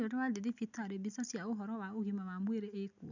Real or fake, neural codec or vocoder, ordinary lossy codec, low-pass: real; none; none; 7.2 kHz